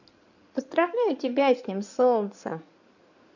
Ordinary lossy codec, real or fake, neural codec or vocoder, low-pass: none; fake; codec, 16 kHz in and 24 kHz out, 2.2 kbps, FireRedTTS-2 codec; 7.2 kHz